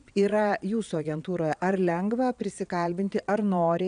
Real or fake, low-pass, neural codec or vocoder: fake; 9.9 kHz; vocoder, 22.05 kHz, 80 mel bands, Vocos